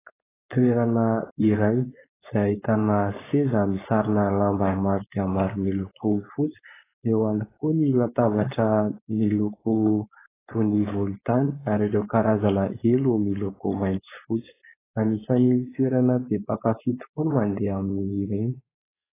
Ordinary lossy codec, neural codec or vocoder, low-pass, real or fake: AAC, 16 kbps; codec, 16 kHz, 4.8 kbps, FACodec; 3.6 kHz; fake